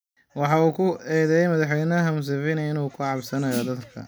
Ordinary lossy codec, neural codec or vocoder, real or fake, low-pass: none; none; real; none